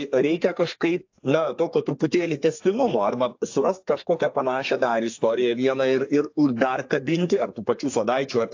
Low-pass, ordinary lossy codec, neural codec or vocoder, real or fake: 7.2 kHz; AAC, 48 kbps; codec, 32 kHz, 1.9 kbps, SNAC; fake